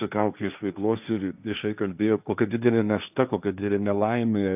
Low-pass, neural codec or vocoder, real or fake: 3.6 kHz; codec, 16 kHz, 1.1 kbps, Voila-Tokenizer; fake